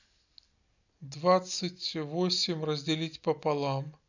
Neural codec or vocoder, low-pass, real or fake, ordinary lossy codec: none; 7.2 kHz; real; none